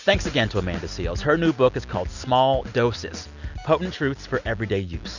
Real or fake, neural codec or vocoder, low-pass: real; none; 7.2 kHz